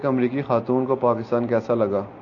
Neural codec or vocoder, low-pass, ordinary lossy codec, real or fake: none; 7.2 kHz; AAC, 48 kbps; real